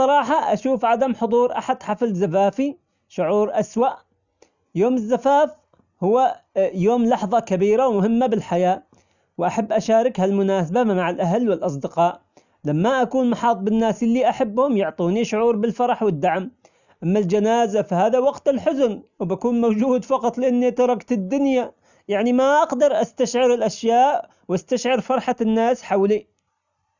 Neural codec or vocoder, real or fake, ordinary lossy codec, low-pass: none; real; none; 7.2 kHz